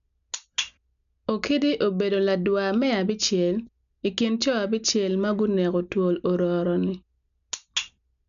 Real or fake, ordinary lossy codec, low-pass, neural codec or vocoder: real; none; 7.2 kHz; none